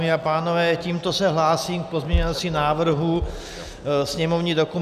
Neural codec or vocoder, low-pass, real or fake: none; 14.4 kHz; real